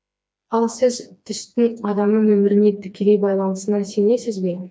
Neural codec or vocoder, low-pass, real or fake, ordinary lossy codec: codec, 16 kHz, 2 kbps, FreqCodec, smaller model; none; fake; none